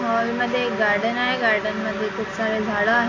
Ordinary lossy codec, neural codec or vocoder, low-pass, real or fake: AAC, 32 kbps; none; 7.2 kHz; real